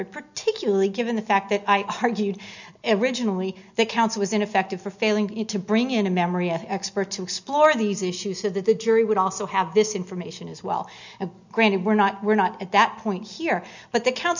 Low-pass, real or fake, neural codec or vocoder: 7.2 kHz; real; none